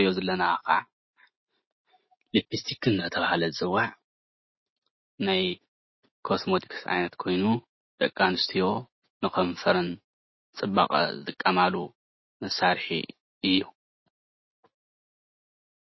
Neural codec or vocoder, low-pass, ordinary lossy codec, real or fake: none; 7.2 kHz; MP3, 24 kbps; real